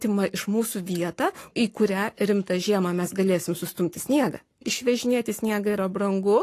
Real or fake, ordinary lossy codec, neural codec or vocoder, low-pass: fake; AAC, 48 kbps; codec, 44.1 kHz, 7.8 kbps, Pupu-Codec; 14.4 kHz